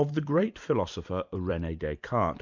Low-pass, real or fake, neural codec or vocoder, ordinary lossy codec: 7.2 kHz; fake; vocoder, 44.1 kHz, 128 mel bands every 256 samples, BigVGAN v2; MP3, 64 kbps